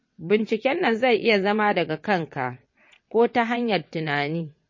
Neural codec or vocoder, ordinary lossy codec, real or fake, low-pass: vocoder, 22.05 kHz, 80 mel bands, WaveNeXt; MP3, 32 kbps; fake; 7.2 kHz